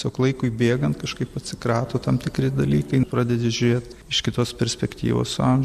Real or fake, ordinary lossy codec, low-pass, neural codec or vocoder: real; MP3, 96 kbps; 14.4 kHz; none